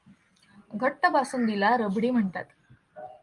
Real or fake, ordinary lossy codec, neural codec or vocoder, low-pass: real; Opus, 32 kbps; none; 10.8 kHz